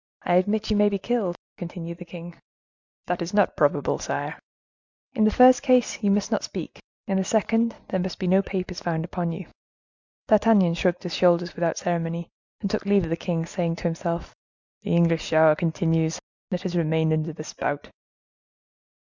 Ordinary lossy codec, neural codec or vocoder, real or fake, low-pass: Opus, 64 kbps; none; real; 7.2 kHz